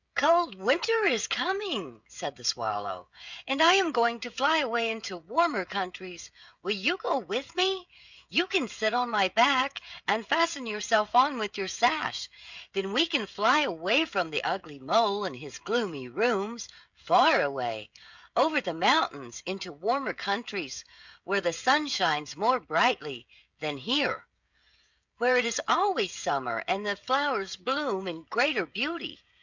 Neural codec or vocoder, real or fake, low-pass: codec, 16 kHz, 16 kbps, FreqCodec, smaller model; fake; 7.2 kHz